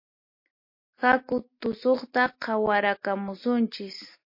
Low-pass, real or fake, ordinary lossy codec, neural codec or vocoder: 5.4 kHz; real; MP3, 32 kbps; none